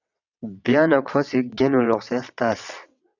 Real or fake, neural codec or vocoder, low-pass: fake; vocoder, 22.05 kHz, 80 mel bands, WaveNeXt; 7.2 kHz